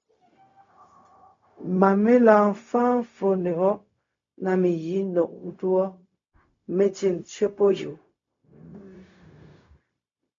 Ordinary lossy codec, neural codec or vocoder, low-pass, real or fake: MP3, 48 kbps; codec, 16 kHz, 0.4 kbps, LongCat-Audio-Codec; 7.2 kHz; fake